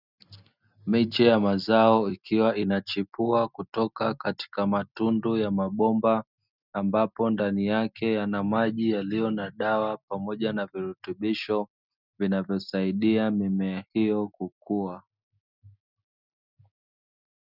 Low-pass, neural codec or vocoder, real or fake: 5.4 kHz; none; real